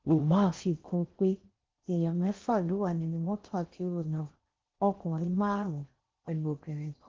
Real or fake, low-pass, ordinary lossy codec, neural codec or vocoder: fake; 7.2 kHz; Opus, 16 kbps; codec, 16 kHz in and 24 kHz out, 0.6 kbps, FocalCodec, streaming, 4096 codes